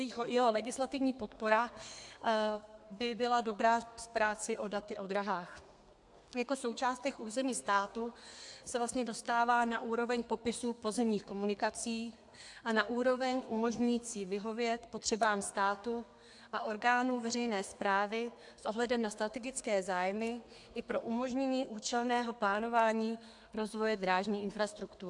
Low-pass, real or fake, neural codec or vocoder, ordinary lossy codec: 10.8 kHz; fake; codec, 32 kHz, 1.9 kbps, SNAC; AAC, 64 kbps